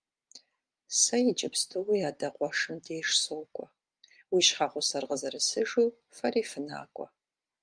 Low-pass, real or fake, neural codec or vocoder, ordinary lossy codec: 9.9 kHz; real; none; Opus, 32 kbps